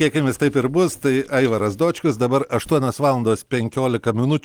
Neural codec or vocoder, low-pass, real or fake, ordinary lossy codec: none; 19.8 kHz; real; Opus, 24 kbps